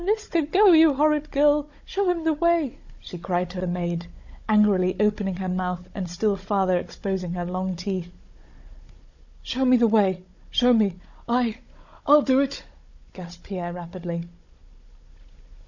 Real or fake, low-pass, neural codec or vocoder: fake; 7.2 kHz; codec, 16 kHz, 16 kbps, FunCodec, trained on Chinese and English, 50 frames a second